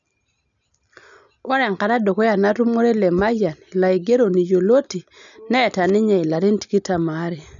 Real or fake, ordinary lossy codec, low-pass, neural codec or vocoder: real; none; 7.2 kHz; none